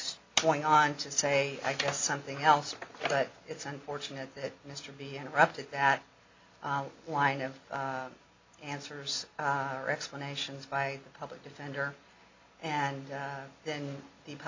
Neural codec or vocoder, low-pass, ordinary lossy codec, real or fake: none; 7.2 kHz; MP3, 64 kbps; real